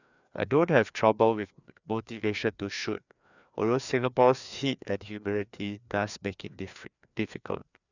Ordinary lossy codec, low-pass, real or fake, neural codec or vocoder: none; 7.2 kHz; fake; codec, 16 kHz, 2 kbps, FreqCodec, larger model